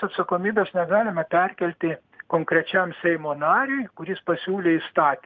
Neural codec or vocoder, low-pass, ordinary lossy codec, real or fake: none; 7.2 kHz; Opus, 32 kbps; real